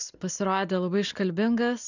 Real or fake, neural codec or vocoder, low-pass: real; none; 7.2 kHz